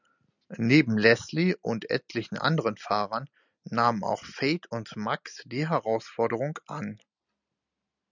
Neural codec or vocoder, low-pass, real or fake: none; 7.2 kHz; real